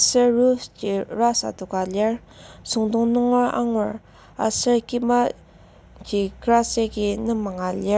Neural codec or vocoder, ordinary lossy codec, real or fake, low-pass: none; none; real; none